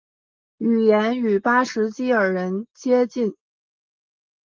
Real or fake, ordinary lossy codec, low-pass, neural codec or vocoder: real; Opus, 24 kbps; 7.2 kHz; none